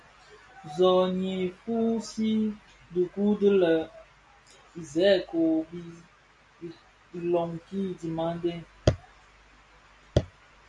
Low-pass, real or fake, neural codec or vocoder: 10.8 kHz; real; none